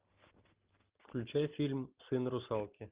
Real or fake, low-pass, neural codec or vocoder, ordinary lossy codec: real; 3.6 kHz; none; Opus, 32 kbps